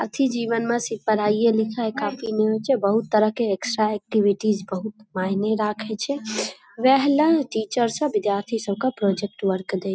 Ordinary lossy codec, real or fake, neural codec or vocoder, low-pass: none; real; none; none